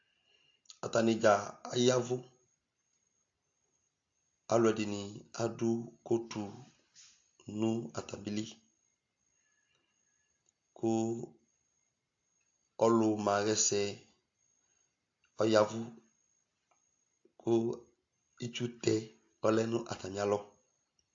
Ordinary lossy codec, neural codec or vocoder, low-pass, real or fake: AAC, 48 kbps; none; 7.2 kHz; real